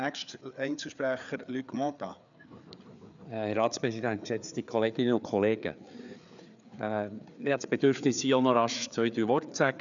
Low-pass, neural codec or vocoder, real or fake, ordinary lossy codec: 7.2 kHz; codec, 16 kHz, 4 kbps, FreqCodec, larger model; fake; none